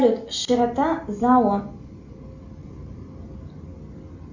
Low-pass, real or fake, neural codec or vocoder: 7.2 kHz; real; none